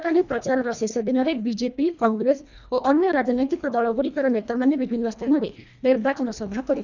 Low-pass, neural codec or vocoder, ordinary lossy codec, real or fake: 7.2 kHz; codec, 24 kHz, 1.5 kbps, HILCodec; none; fake